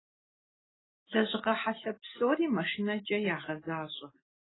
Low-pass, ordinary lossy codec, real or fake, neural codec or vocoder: 7.2 kHz; AAC, 16 kbps; real; none